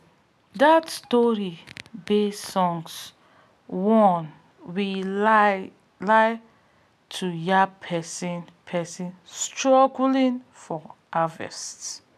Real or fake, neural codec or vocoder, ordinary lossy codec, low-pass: real; none; none; 14.4 kHz